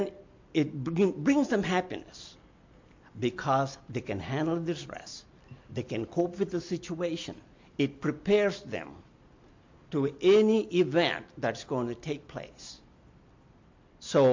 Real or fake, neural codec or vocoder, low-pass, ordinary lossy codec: real; none; 7.2 kHz; MP3, 48 kbps